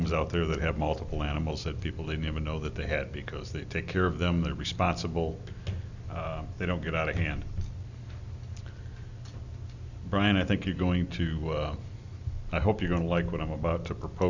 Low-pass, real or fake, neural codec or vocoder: 7.2 kHz; real; none